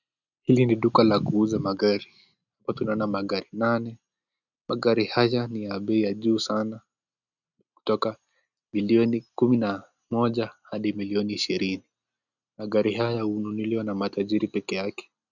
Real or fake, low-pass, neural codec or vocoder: real; 7.2 kHz; none